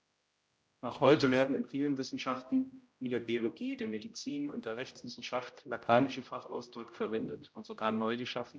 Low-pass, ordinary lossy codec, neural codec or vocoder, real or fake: none; none; codec, 16 kHz, 0.5 kbps, X-Codec, HuBERT features, trained on general audio; fake